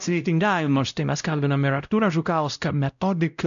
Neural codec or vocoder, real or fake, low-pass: codec, 16 kHz, 0.5 kbps, X-Codec, WavLM features, trained on Multilingual LibriSpeech; fake; 7.2 kHz